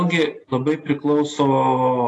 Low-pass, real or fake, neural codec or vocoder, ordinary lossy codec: 10.8 kHz; real; none; AAC, 48 kbps